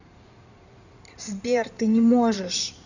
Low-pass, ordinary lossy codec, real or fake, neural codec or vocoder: 7.2 kHz; none; fake; codec, 16 kHz in and 24 kHz out, 2.2 kbps, FireRedTTS-2 codec